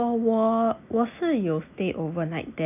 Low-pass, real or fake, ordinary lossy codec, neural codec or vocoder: 3.6 kHz; real; none; none